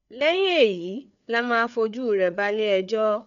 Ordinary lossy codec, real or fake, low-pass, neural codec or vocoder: none; fake; 7.2 kHz; codec, 16 kHz, 4 kbps, FreqCodec, larger model